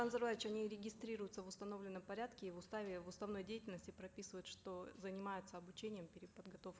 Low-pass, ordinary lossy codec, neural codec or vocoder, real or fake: none; none; none; real